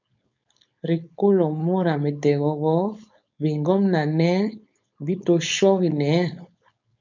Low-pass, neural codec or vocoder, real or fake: 7.2 kHz; codec, 16 kHz, 4.8 kbps, FACodec; fake